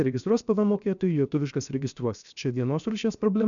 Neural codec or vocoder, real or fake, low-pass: codec, 16 kHz, 0.7 kbps, FocalCodec; fake; 7.2 kHz